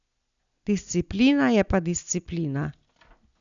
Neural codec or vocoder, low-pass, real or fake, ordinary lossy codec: none; 7.2 kHz; real; none